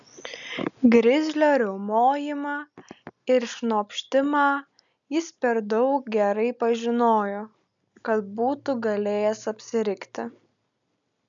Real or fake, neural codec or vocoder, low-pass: real; none; 7.2 kHz